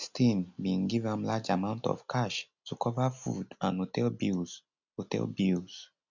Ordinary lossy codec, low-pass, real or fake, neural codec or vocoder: none; 7.2 kHz; real; none